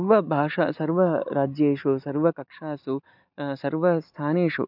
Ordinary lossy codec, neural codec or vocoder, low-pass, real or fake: none; none; 5.4 kHz; real